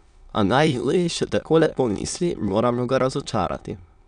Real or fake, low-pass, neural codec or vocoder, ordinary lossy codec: fake; 9.9 kHz; autoencoder, 22.05 kHz, a latent of 192 numbers a frame, VITS, trained on many speakers; none